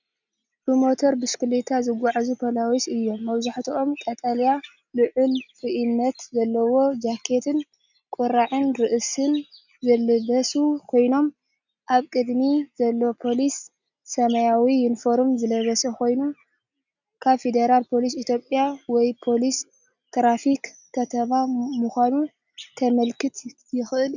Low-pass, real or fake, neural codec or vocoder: 7.2 kHz; real; none